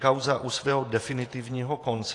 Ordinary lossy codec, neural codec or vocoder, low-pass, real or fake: AAC, 48 kbps; vocoder, 48 kHz, 128 mel bands, Vocos; 10.8 kHz; fake